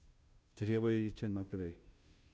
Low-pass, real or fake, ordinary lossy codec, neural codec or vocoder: none; fake; none; codec, 16 kHz, 0.5 kbps, FunCodec, trained on Chinese and English, 25 frames a second